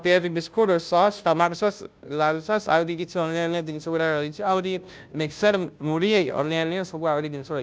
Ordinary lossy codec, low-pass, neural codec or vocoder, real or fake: none; none; codec, 16 kHz, 0.5 kbps, FunCodec, trained on Chinese and English, 25 frames a second; fake